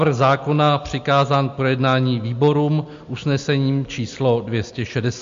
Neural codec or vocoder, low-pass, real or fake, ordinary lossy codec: none; 7.2 kHz; real; MP3, 48 kbps